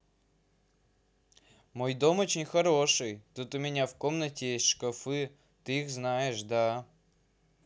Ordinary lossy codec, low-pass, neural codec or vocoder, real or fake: none; none; none; real